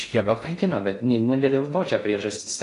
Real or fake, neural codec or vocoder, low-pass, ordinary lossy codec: fake; codec, 16 kHz in and 24 kHz out, 0.6 kbps, FocalCodec, streaming, 4096 codes; 10.8 kHz; AAC, 48 kbps